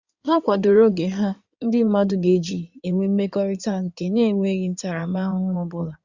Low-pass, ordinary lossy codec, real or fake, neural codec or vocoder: 7.2 kHz; Opus, 64 kbps; fake; codec, 16 kHz in and 24 kHz out, 2.2 kbps, FireRedTTS-2 codec